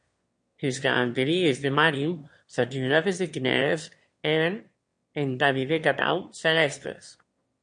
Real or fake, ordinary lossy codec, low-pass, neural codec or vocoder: fake; MP3, 48 kbps; 9.9 kHz; autoencoder, 22.05 kHz, a latent of 192 numbers a frame, VITS, trained on one speaker